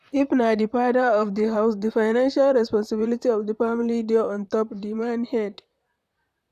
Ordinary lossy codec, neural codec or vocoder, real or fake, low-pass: none; vocoder, 44.1 kHz, 128 mel bands, Pupu-Vocoder; fake; 14.4 kHz